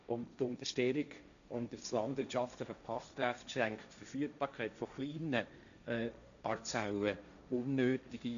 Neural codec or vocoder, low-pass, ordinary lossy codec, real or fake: codec, 16 kHz, 1.1 kbps, Voila-Tokenizer; 7.2 kHz; none; fake